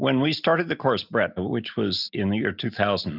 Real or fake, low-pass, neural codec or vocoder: real; 5.4 kHz; none